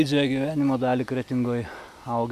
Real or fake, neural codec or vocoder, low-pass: real; none; 14.4 kHz